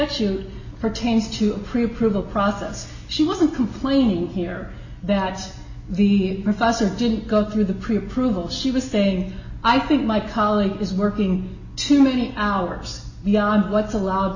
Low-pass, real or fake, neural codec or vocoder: 7.2 kHz; real; none